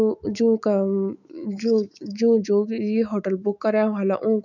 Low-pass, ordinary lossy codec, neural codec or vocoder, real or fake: 7.2 kHz; none; autoencoder, 48 kHz, 128 numbers a frame, DAC-VAE, trained on Japanese speech; fake